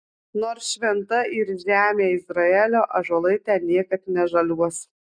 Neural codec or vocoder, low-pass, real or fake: none; 9.9 kHz; real